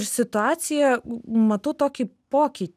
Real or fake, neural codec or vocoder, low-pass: real; none; 14.4 kHz